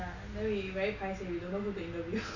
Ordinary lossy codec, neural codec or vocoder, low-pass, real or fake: none; none; 7.2 kHz; real